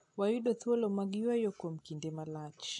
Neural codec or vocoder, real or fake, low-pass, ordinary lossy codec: none; real; 10.8 kHz; AAC, 64 kbps